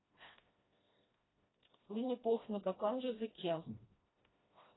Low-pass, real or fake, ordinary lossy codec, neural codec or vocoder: 7.2 kHz; fake; AAC, 16 kbps; codec, 16 kHz, 1 kbps, FreqCodec, smaller model